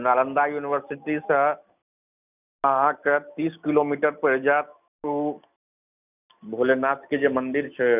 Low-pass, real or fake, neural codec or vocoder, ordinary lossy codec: 3.6 kHz; real; none; none